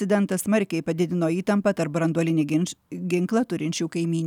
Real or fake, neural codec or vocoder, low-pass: real; none; 19.8 kHz